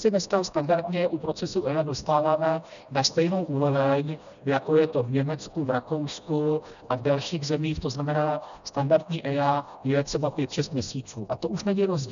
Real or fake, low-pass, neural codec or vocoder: fake; 7.2 kHz; codec, 16 kHz, 1 kbps, FreqCodec, smaller model